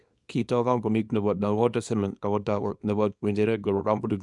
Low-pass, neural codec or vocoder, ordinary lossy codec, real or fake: 10.8 kHz; codec, 24 kHz, 0.9 kbps, WavTokenizer, small release; none; fake